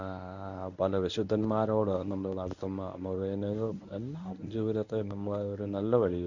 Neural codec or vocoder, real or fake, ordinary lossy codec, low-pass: codec, 24 kHz, 0.9 kbps, WavTokenizer, medium speech release version 1; fake; none; 7.2 kHz